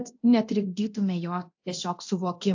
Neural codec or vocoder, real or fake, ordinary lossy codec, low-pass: codec, 24 kHz, 0.9 kbps, DualCodec; fake; AAC, 48 kbps; 7.2 kHz